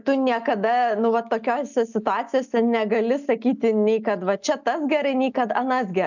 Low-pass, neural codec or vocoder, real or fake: 7.2 kHz; none; real